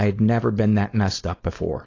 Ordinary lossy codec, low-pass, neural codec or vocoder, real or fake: AAC, 32 kbps; 7.2 kHz; codec, 16 kHz, 4.8 kbps, FACodec; fake